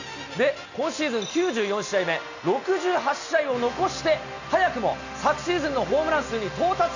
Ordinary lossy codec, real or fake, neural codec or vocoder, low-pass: none; real; none; 7.2 kHz